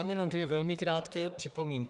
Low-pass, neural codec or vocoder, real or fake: 10.8 kHz; codec, 24 kHz, 1 kbps, SNAC; fake